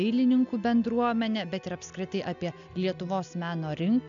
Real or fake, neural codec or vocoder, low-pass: real; none; 7.2 kHz